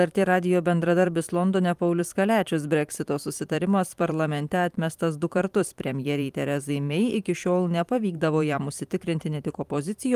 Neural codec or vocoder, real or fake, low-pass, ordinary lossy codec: vocoder, 44.1 kHz, 128 mel bands every 512 samples, BigVGAN v2; fake; 14.4 kHz; Opus, 32 kbps